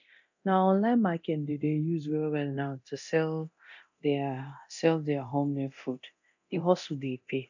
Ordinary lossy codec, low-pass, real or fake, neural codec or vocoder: none; 7.2 kHz; fake; codec, 24 kHz, 0.9 kbps, DualCodec